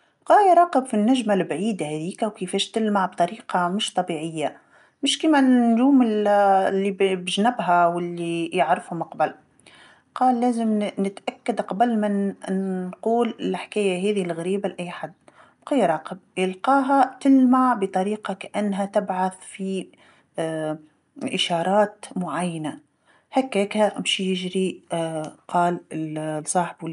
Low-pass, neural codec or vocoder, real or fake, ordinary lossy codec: 10.8 kHz; none; real; none